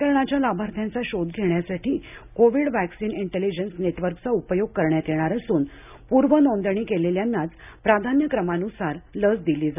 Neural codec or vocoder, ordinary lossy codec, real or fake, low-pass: none; none; real; 3.6 kHz